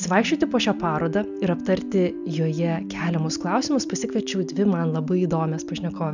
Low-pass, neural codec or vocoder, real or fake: 7.2 kHz; none; real